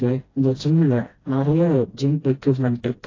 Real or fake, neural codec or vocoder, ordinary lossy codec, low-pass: fake; codec, 16 kHz, 1 kbps, FreqCodec, smaller model; AAC, 32 kbps; 7.2 kHz